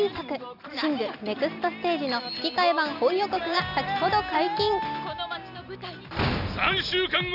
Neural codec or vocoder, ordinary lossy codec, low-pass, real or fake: none; none; 5.4 kHz; real